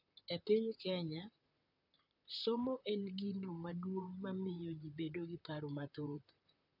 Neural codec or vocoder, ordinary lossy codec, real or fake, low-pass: vocoder, 44.1 kHz, 128 mel bands, Pupu-Vocoder; none; fake; 5.4 kHz